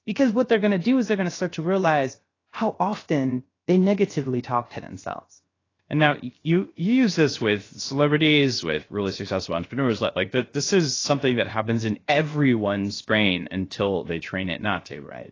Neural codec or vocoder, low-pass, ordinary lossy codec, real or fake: codec, 16 kHz, 0.7 kbps, FocalCodec; 7.2 kHz; AAC, 32 kbps; fake